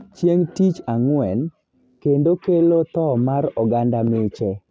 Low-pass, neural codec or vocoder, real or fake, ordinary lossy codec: none; none; real; none